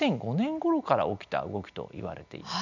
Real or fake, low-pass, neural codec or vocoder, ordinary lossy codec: real; 7.2 kHz; none; none